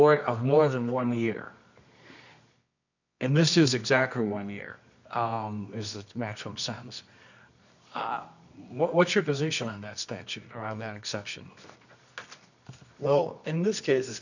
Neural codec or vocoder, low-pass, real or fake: codec, 24 kHz, 0.9 kbps, WavTokenizer, medium music audio release; 7.2 kHz; fake